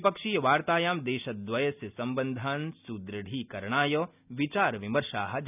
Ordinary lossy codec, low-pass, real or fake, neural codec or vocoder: none; 3.6 kHz; real; none